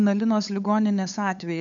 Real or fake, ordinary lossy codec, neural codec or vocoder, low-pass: fake; MP3, 64 kbps; codec, 16 kHz, 8 kbps, FunCodec, trained on LibriTTS, 25 frames a second; 7.2 kHz